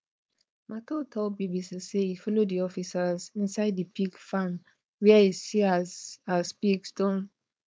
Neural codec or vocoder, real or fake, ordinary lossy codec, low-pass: codec, 16 kHz, 4.8 kbps, FACodec; fake; none; none